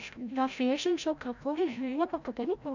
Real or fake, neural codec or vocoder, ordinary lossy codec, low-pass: fake; codec, 16 kHz, 0.5 kbps, FreqCodec, larger model; none; 7.2 kHz